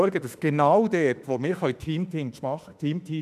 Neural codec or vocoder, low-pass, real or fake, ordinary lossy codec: autoencoder, 48 kHz, 32 numbers a frame, DAC-VAE, trained on Japanese speech; 14.4 kHz; fake; none